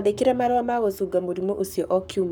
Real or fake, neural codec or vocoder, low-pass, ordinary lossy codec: fake; codec, 44.1 kHz, 7.8 kbps, Pupu-Codec; none; none